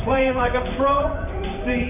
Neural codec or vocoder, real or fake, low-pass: vocoder, 44.1 kHz, 128 mel bands, Pupu-Vocoder; fake; 3.6 kHz